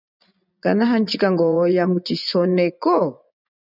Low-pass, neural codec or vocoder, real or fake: 5.4 kHz; vocoder, 24 kHz, 100 mel bands, Vocos; fake